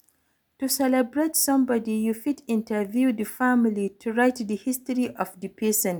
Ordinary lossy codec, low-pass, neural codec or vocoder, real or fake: none; none; none; real